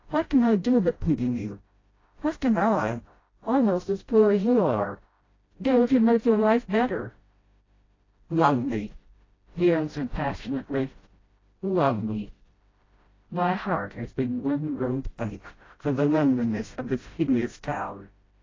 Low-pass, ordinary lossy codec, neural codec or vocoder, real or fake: 7.2 kHz; AAC, 32 kbps; codec, 16 kHz, 0.5 kbps, FreqCodec, smaller model; fake